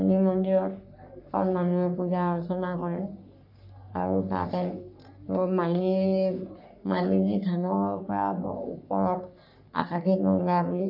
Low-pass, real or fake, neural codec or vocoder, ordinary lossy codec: 5.4 kHz; fake; codec, 44.1 kHz, 3.4 kbps, Pupu-Codec; none